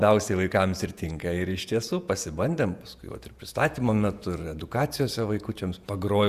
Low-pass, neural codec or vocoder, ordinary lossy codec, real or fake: 14.4 kHz; vocoder, 48 kHz, 128 mel bands, Vocos; Opus, 64 kbps; fake